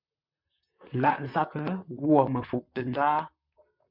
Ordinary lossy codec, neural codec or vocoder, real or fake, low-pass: MP3, 48 kbps; vocoder, 44.1 kHz, 128 mel bands, Pupu-Vocoder; fake; 5.4 kHz